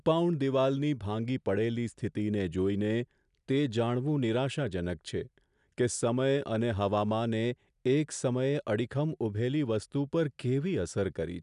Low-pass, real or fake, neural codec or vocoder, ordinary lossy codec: 10.8 kHz; real; none; none